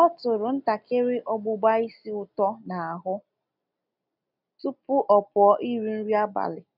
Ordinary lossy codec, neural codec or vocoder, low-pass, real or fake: none; none; 5.4 kHz; real